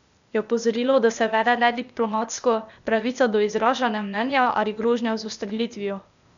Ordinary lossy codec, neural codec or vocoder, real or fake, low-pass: none; codec, 16 kHz, 0.8 kbps, ZipCodec; fake; 7.2 kHz